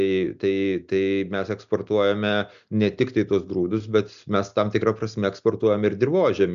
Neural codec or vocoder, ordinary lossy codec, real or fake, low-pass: none; AAC, 96 kbps; real; 7.2 kHz